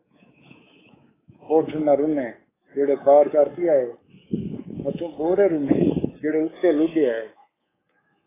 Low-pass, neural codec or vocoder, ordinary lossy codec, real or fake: 3.6 kHz; codec, 24 kHz, 3.1 kbps, DualCodec; AAC, 16 kbps; fake